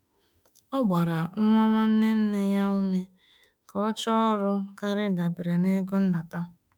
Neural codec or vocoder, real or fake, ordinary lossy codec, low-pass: autoencoder, 48 kHz, 32 numbers a frame, DAC-VAE, trained on Japanese speech; fake; none; none